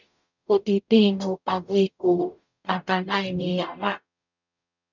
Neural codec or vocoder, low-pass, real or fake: codec, 44.1 kHz, 0.9 kbps, DAC; 7.2 kHz; fake